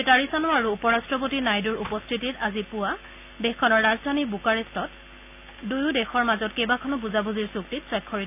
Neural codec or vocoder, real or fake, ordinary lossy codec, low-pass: none; real; none; 3.6 kHz